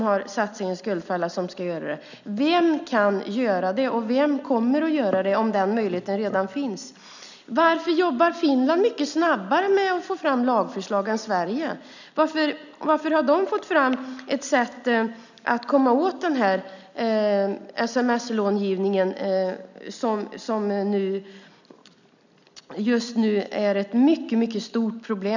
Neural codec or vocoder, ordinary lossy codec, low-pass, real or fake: none; none; 7.2 kHz; real